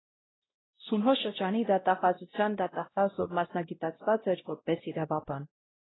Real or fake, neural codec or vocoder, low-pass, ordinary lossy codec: fake; codec, 16 kHz, 0.5 kbps, X-Codec, WavLM features, trained on Multilingual LibriSpeech; 7.2 kHz; AAC, 16 kbps